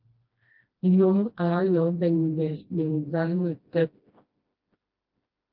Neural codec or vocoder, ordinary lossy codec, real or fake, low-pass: codec, 16 kHz, 1 kbps, FreqCodec, smaller model; Opus, 32 kbps; fake; 5.4 kHz